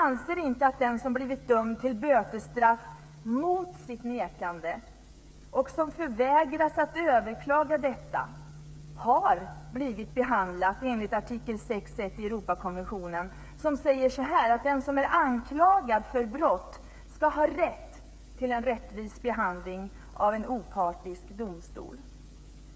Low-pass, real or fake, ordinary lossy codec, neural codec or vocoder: none; fake; none; codec, 16 kHz, 8 kbps, FreqCodec, smaller model